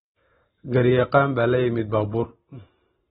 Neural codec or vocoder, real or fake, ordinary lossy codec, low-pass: none; real; AAC, 16 kbps; 19.8 kHz